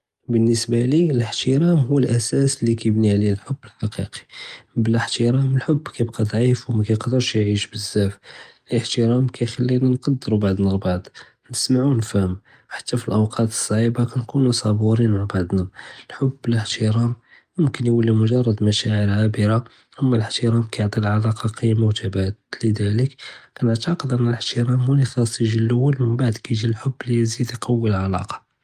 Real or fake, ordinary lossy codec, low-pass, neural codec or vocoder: real; Opus, 32 kbps; 14.4 kHz; none